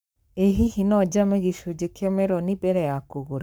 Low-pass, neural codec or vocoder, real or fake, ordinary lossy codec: none; codec, 44.1 kHz, 7.8 kbps, Pupu-Codec; fake; none